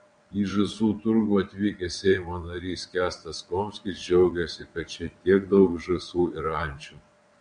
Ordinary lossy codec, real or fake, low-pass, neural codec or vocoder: MP3, 64 kbps; fake; 9.9 kHz; vocoder, 22.05 kHz, 80 mel bands, WaveNeXt